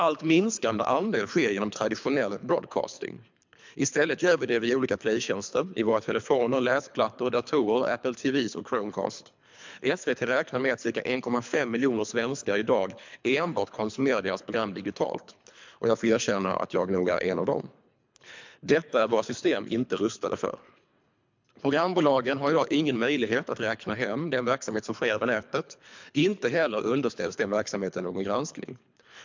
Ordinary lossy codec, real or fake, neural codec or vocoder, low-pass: MP3, 64 kbps; fake; codec, 24 kHz, 3 kbps, HILCodec; 7.2 kHz